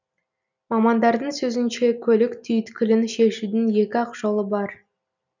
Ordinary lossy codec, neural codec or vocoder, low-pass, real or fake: none; none; 7.2 kHz; real